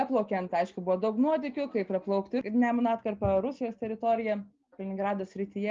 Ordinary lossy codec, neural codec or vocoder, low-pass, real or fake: Opus, 32 kbps; none; 7.2 kHz; real